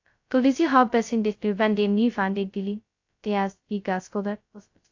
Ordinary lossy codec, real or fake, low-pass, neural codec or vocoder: AAC, 48 kbps; fake; 7.2 kHz; codec, 16 kHz, 0.2 kbps, FocalCodec